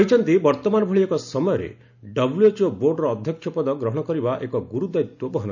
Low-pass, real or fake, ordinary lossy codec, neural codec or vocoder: 7.2 kHz; real; none; none